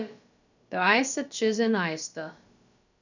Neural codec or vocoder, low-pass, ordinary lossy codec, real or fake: codec, 16 kHz, about 1 kbps, DyCAST, with the encoder's durations; 7.2 kHz; none; fake